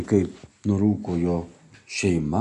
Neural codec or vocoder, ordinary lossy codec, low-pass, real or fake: none; MP3, 64 kbps; 10.8 kHz; real